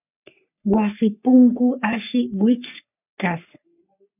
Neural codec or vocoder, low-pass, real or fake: codec, 44.1 kHz, 3.4 kbps, Pupu-Codec; 3.6 kHz; fake